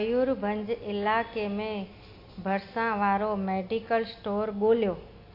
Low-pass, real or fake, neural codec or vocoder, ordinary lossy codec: 5.4 kHz; real; none; AAC, 32 kbps